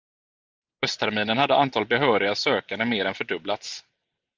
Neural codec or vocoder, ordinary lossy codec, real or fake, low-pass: none; Opus, 32 kbps; real; 7.2 kHz